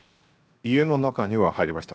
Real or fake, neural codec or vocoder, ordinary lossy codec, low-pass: fake; codec, 16 kHz, 0.7 kbps, FocalCodec; none; none